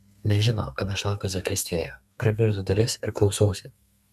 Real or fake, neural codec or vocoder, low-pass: fake; codec, 32 kHz, 1.9 kbps, SNAC; 14.4 kHz